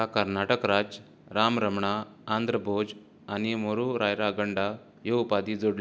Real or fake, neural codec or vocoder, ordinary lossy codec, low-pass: real; none; none; none